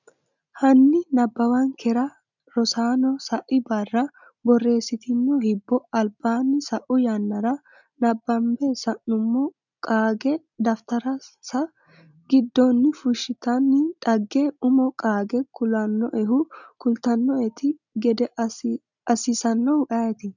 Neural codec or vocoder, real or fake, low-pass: none; real; 7.2 kHz